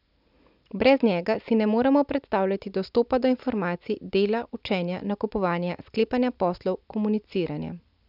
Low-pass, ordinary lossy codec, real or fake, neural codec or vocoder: 5.4 kHz; none; real; none